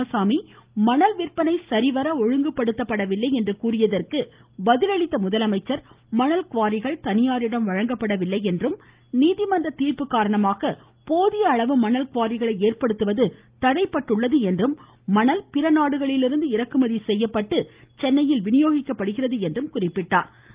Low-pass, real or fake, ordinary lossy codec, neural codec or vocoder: 3.6 kHz; real; Opus, 32 kbps; none